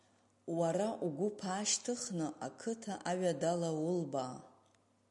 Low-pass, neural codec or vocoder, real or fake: 10.8 kHz; none; real